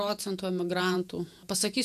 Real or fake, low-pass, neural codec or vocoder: fake; 14.4 kHz; vocoder, 48 kHz, 128 mel bands, Vocos